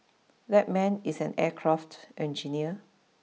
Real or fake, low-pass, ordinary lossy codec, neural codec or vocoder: real; none; none; none